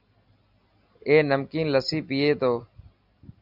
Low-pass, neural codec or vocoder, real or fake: 5.4 kHz; none; real